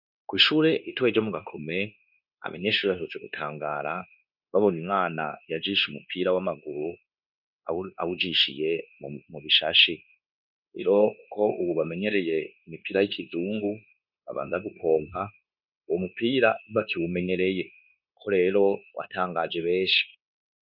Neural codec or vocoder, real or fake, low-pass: codec, 16 kHz, 0.9 kbps, LongCat-Audio-Codec; fake; 5.4 kHz